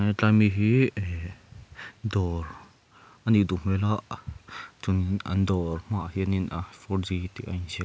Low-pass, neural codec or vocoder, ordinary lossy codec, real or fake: none; none; none; real